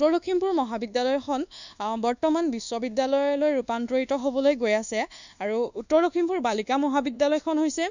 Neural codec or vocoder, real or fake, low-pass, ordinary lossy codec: codec, 24 kHz, 1.2 kbps, DualCodec; fake; 7.2 kHz; none